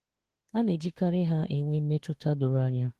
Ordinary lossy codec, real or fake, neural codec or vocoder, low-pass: Opus, 16 kbps; fake; autoencoder, 48 kHz, 32 numbers a frame, DAC-VAE, trained on Japanese speech; 14.4 kHz